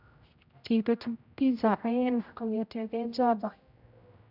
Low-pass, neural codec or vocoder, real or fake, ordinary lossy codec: 5.4 kHz; codec, 16 kHz, 0.5 kbps, X-Codec, HuBERT features, trained on general audio; fake; none